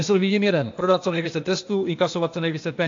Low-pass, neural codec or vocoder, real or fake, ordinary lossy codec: 7.2 kHz; codec, 16 kHz, 0.8 kbps, ZipCodec; fake; AAC, 48 kbps